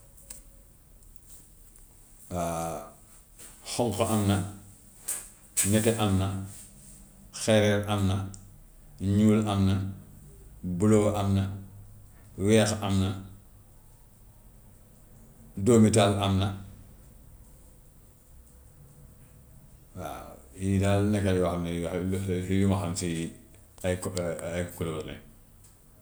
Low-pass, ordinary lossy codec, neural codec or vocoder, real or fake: none; none; none; real